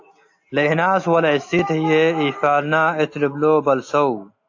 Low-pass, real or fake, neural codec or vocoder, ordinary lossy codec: 7.2 kHz; real; none; AAC, 48 kbps